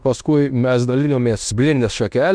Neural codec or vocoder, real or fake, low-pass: codec, 16 kHz in and 24 kHz out, 0.9 kbps, LongCat-Audio-Codec, fine tuned four codebook decoder; fake; 9.9 kHz